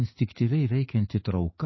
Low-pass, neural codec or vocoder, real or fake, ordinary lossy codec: 7.2 kHz; codec, 44.1 kHz, 7.8 kbps, DAC; fake; MP3, 24 kbps